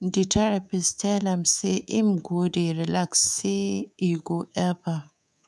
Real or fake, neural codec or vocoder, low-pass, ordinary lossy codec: fake; codec, 24 kHz, 3.1 kbps, DualCodec; none; none